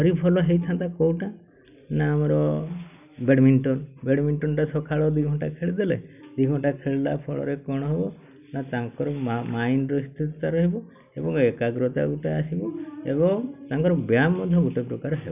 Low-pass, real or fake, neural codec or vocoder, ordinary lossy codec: 3.6 kHz; real; none; none